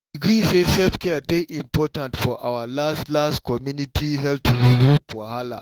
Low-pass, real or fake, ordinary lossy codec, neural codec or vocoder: 19.8 kHz; fake; Opus, 24 kbps; autoencoder, 48 kHz, 32 numbers a frame, DAC-VAE, trained on Japanese speech